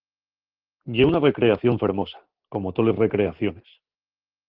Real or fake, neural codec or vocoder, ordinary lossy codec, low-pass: real; none; Opus, 16 kbps; 5.4 kHz